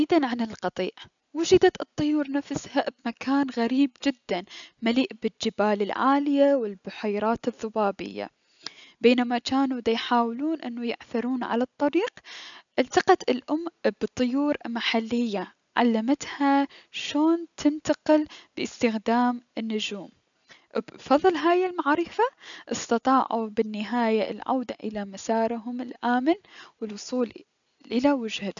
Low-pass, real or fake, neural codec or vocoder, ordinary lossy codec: 7.2 kHz; real; none; none